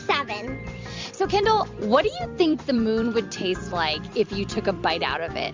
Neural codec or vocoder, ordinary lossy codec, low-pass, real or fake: vocoder, 44.1 kHz, 128 mel bands every 256 samples, BigVGAN v2; MP3, 64 kbps; 7.2 kHz; fake